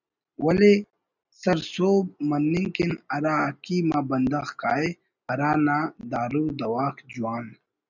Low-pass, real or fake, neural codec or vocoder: 7.2 kHz; real; none